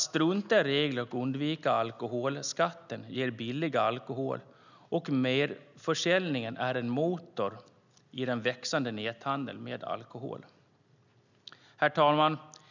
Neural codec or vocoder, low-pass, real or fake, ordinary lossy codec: none; 7.2 kHz; real; none